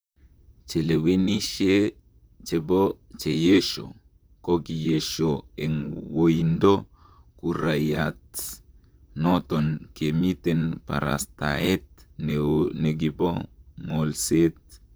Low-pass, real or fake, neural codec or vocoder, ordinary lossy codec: none; fake; vocoder, 44.1 kHz, 128 mel bands, Pupu-Vocoder; none